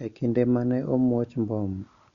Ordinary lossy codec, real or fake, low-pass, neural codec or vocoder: MP3, 96 kbps; real; 7.2 kHz; none